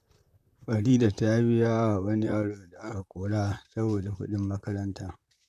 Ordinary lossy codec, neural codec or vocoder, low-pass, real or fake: none; vocoder, 44.1 kHz, 128 mel bands, Pupu-Vocoder; 14.4 kHz; fake